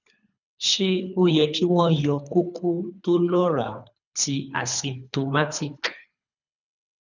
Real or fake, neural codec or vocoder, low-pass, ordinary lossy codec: fake; codec, 24 kHz, 3 kbps, HILCodec; 7.2 kHz; none